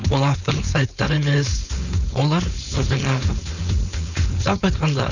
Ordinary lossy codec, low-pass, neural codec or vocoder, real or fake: none; 7.2 kHz; codec, 16 kHz, 4.8 kbps, FACodec; fake